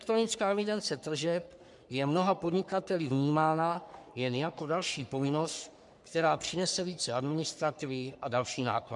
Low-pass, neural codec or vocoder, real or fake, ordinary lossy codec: 10.8 kHz; codec, 44.1 kHz, 3.4 kbps, Pupu-Codec; fake; MP3, 96 kbps